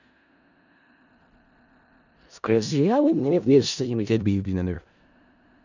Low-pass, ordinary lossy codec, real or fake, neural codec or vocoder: 7.2 kHz; none; fake; codec, 16 kHz in and 24 kHz out, 0.4 kbps, LongCat-Audio-Codec, four codebook decoder